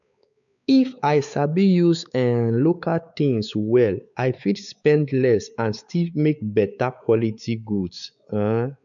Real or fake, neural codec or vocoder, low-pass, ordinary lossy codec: fake; codec, 16 kHz, 4 kbps, X-Codec, WavLM features, trained on Multilingual LibriSpeech; 7.2 kHz; none